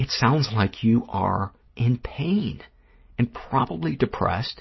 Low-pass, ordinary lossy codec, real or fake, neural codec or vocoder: 7.2 kHz; MP3, 24 kbps; real; none